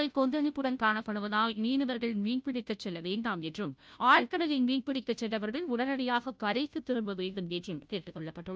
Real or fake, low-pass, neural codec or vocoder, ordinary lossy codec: fake; none; codec, 16 kHz, 0.5 kbps, FunCodec, trained on Chinese and English, 25 frames a second; none